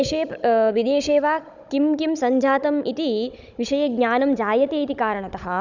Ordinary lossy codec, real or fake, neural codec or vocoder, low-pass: none; fake; codec, 16 kHz, 16 kbps, FunCodec, trained on Chinese and English, 50 frames a second; 7.2 kHz